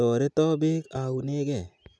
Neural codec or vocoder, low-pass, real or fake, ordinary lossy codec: none; none; real; none